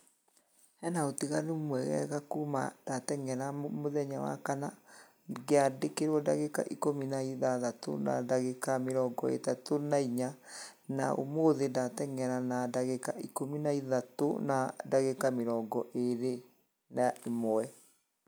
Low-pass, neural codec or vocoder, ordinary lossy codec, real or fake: none; none; none; real